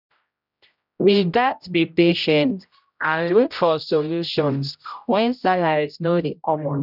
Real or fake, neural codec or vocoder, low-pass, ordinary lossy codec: fake; codec, 16 kHz, 0.5 kbps, X-Codec, HuBERT features, trained on general audio; 5.4 kHz; none